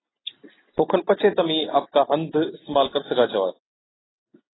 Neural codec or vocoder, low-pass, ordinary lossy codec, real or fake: none; 7.2 kHz; AAC, 16 kbps; real